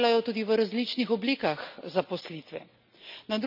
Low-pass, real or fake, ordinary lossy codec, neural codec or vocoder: 5.4 kHz; real; none; none